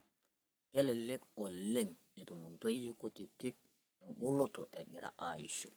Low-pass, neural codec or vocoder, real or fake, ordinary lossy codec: none; codec, 44.1 kHz, 3.4 kbps, Pupu-Codec; fake; none